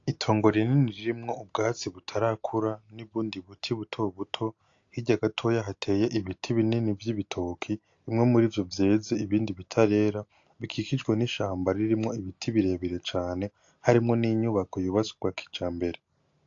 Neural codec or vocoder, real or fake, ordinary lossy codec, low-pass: none; real; AAC, 48 kbps; 7.2 kHz